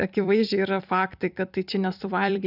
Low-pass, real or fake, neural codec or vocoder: 5.4 kHz; fake; vocoder, 44.1 kHz, 128 mel bands every 256 samples, BigVGAN v2